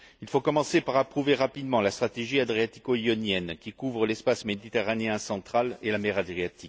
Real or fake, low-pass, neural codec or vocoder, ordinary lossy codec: real; none; none; none